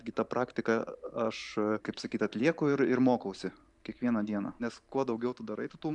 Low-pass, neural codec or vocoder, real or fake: 10.8 kHz; vocoder, 44.1 kHz, 128 mel bands every 512 samples, BigVGAN v2; fake